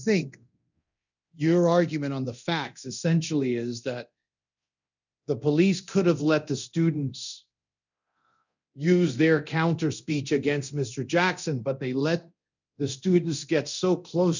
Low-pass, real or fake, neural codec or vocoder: 7.2 kHz; fake; codec, 24 kHz, 0.9 kbps, DualCodec